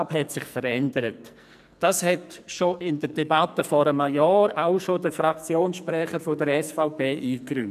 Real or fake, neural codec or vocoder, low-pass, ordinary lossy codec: fake; codec, 44.1 kHz, 2.6 kbps, SNAC; 14.4 kHz; none